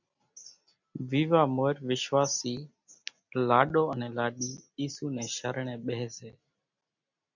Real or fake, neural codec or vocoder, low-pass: real; none; 7.2 kHz